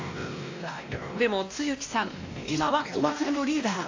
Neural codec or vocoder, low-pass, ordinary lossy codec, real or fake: codec, 16 kHz, 1 kbps, X-Codec, WavLM features, trained on Multilingual LibriSpeech; 7.2 kHz; AAC, 48 kbps; fake